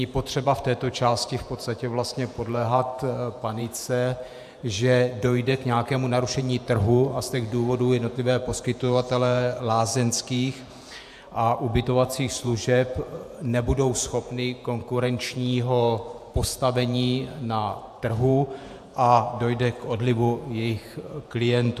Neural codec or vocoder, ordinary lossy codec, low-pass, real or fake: vocoder, 48 kHz, 128 mel bands, Vocos; AAC, 96 kbps; 14.4 kHz; fake